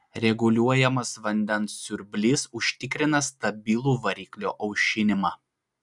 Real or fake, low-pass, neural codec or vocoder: real; 10.8 kHz; none